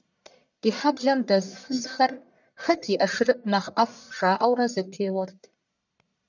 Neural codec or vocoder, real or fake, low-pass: codec, 44.1 kHz, 1.7 kbps, Pupu-Codec; fake; 7.2 kHz